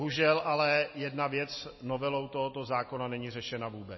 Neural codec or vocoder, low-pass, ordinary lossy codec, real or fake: none; 7.2 kHz; MP3, 24 kbps; real